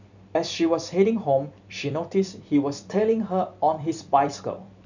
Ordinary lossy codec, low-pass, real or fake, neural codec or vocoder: none; 7.2 kHz; fake; vocoder, 44.1 kHz, 128 mel bands every 256 samples, BigVGAN v2